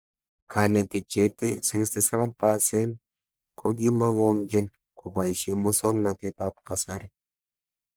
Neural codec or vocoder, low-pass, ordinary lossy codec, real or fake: codec, 44.1 kHz, 1.7 kbps, Pupu-Codec; none; none; fake